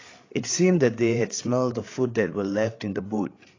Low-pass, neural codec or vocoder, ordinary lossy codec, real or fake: 7.2 kHz; codec, 16 kHz, 8 kbps, FreqCodec, larger model; AAC, 32 kbps; fake